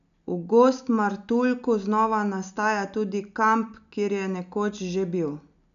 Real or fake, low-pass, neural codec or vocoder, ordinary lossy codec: real; 7.2 kHz; none; none